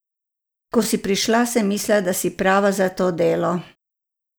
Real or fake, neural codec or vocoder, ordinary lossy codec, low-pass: real; none; none; none